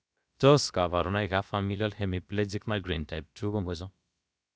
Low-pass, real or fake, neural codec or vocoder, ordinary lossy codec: none; fake; codec, 16 kHz, about 1 kbps, DyCAST, with the encoder's durations; none